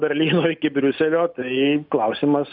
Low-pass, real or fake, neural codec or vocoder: 5.4 kHz; real; none